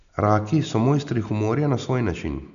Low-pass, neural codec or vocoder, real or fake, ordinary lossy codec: 7.2 kHz; none; real; none